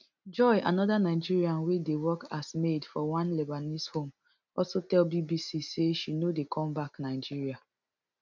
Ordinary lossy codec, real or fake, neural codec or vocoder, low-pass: none; real; none; 7.2 kHz